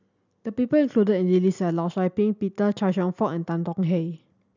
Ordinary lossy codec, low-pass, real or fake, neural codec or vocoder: none; 7.2 kHz; real; none